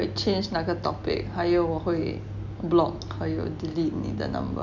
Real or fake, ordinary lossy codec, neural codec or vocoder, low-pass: real; none; none; 7.2 kHz